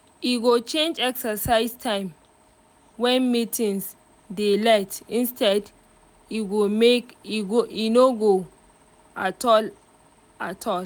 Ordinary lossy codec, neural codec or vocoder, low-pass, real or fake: none; none; none; real